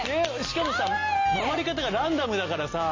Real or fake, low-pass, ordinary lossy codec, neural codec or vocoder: real; 7.2 kHz; MP3, 32 kbps; none